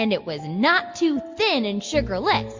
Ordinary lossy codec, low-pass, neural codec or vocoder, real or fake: MP3, 48 kbps; 7.2 kHz; none; real